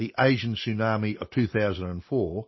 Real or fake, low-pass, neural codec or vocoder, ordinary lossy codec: real; 7.2 kHz; none; MP3, 24 kbps